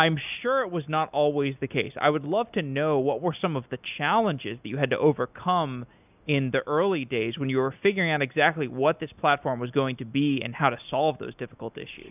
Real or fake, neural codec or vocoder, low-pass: real; none; 3.6 kHz